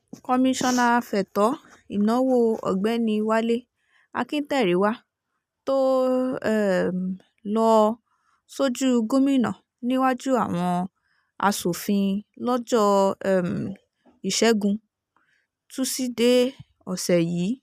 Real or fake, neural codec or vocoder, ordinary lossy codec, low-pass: real; none; none; 14.4 kHz